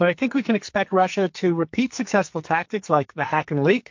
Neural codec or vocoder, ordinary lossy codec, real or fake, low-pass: codec, 44.1 kHz, 2.6 kbps, SNAC; MP3, 48 kbps; fake; 7.2 kHz